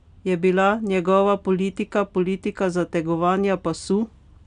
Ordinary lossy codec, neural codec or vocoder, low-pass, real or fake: none; none; 9.9 kHz; real